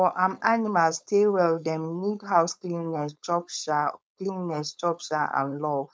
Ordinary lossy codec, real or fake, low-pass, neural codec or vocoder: none; fake; none; codec, 16 kHz, 4.8 kbps, FACodec